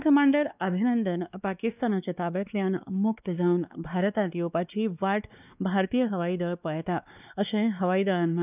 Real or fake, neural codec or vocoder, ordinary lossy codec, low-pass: fake; codec, 16 kHz, 2 kbps, X-Codec, WavLM features, trained on Multilingual LibriSpeech; none; 3.6 kHz